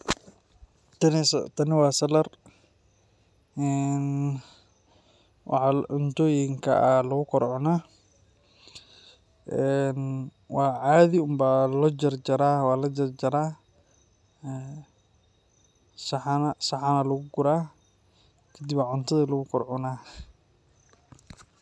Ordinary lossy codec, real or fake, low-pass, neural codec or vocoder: none; real; none; none